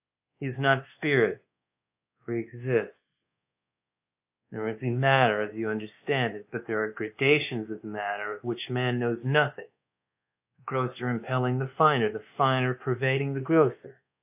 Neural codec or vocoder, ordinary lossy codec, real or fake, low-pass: codec, 24 kHz, 1.2 kbps, DualCodec; AAC, 32 kbps; fake; 3.6 kHz